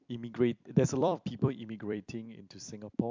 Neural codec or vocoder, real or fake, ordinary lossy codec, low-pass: vocoder, 44.1 kHz, 128 mel bands every 256 samples, BigVGAN v2; fake; MP3, 64 kbps; 7.2 kHz